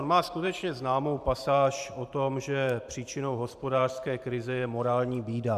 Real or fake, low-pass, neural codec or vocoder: fake; 14.4 kHz; vocoder, 44.1 kHz, 128 mel bands every 512 samples, BigVGAN v2